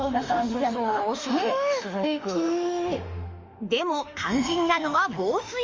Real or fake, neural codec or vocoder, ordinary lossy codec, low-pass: fake; autoencoder, 48 kHz, 32 numbers a frame, DAC-VAE, trained on Japanese speech; Opus, 32 kbps; 7.2 kHz